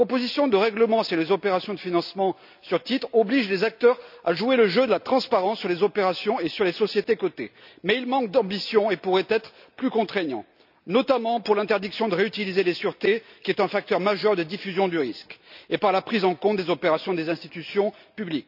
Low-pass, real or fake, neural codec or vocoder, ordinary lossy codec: 5.4 kHz; real; none; none